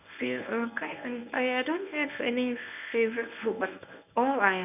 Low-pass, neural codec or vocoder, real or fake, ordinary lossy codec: 3.6 kHz; codec, 24 kHz, 0.9 kbps, WavTokenizer, medium speech release version 1; fake; none